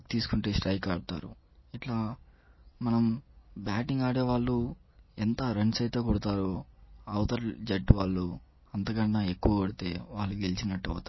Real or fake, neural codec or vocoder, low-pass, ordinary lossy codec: real; none; 7.2 kHz; MP3, 24 kbps